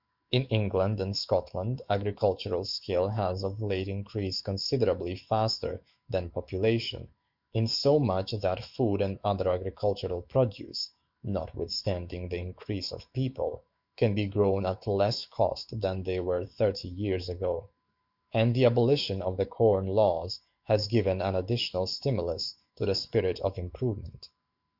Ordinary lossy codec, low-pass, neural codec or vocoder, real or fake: Opus, 64 kbps; 5.4 kHz; autoencoder, 48 kHz, 128 numbers a frame, DAC-VAE, trained on Japanese speech; fake